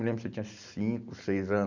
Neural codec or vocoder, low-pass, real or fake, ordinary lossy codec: none; 7.2 kHz; real; none